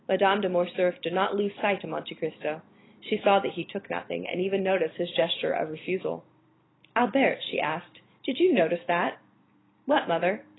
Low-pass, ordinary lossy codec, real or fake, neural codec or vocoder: 7.2 kHz; AAC, 16 kbps; fake; vocoder, 44.1 kHz, 128 mel bands every 512 samples, BigVGAN v2